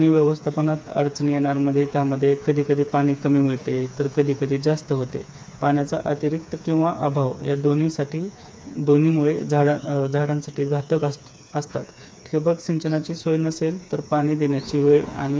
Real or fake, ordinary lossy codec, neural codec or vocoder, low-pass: fake; none; codec, 16 kHz, 4 kbps, FreqCodec, smaller model; none